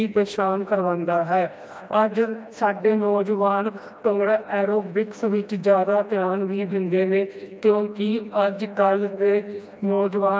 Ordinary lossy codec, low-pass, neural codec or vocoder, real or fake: none; none; codec, 16 kHz, 1 kbps, FreqCodec, smaller model; fake